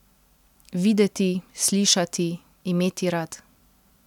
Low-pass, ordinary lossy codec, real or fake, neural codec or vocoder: 19.8 kHz; none; real; none